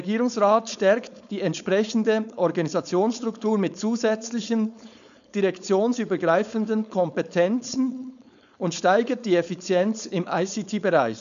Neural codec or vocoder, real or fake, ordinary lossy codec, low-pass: codec, 16 kHz, 4.8 kbps, FACodec; fake; none; 7.2 kHz